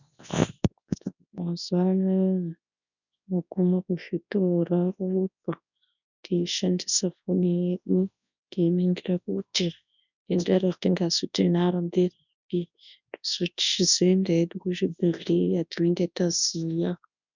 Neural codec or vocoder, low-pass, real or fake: codec, 24 kHz, 0.9 kbps, WavTokenizer, large speech release; 7.2 kHz; fake